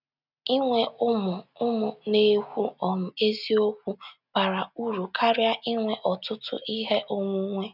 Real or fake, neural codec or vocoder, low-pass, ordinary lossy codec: real; none; 5.4 kHz; none